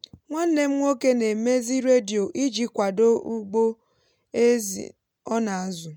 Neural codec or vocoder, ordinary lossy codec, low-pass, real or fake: none; MP3, 96 kbps; 19.8 kHz; real